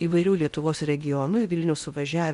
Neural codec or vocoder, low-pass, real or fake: codec, 16 kHz in and 24 kHz out, 0.8 kbps, FocalCodec, streaming, 65536 codes; 10.8 kHz; fake